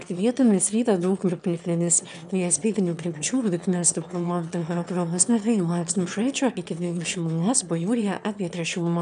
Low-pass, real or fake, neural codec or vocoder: 9.9 kHz; fake; autoencoder, 22.05 kHz, a latent of 192 numbers a frame, VITS, trained on one speaker